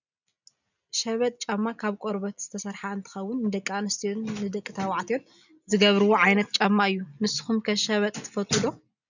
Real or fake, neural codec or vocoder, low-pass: real; none; 7.2 kHz